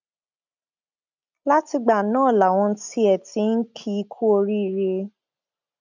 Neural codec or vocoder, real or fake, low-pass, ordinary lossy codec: none; real; 7.2 kHz; none